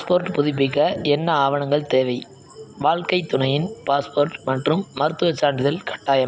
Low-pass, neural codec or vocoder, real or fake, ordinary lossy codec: none; none; real; none